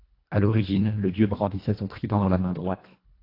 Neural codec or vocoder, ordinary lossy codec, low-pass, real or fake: codec, 24 kHz, 1.5 kbps, HILCodec; AAC, 32 kbps; 5.4 kHz; fake